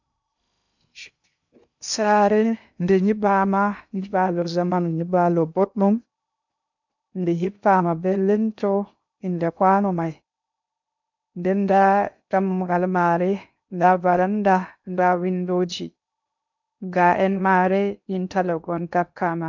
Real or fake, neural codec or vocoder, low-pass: fake; codec, 16 kHz in and 24 kHz out, 0.6 kbps, FocalCodec, streaming, 2048 codes; 7.2 kHz